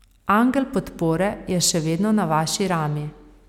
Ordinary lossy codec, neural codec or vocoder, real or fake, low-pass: none; none; real; 19.8 kHz